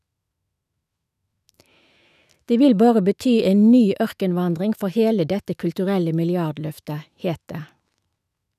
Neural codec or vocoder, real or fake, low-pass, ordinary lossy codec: autoencoder, 48 kHz, 128 numbers a frame, DAC-VAE, trained on Japanese speech; fake; 14.4 kHz; none